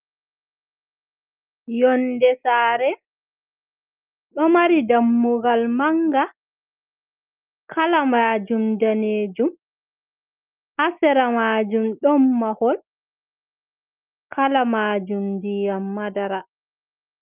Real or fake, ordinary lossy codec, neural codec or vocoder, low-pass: real; Opus, 24 kbps; none; 3.6 kHz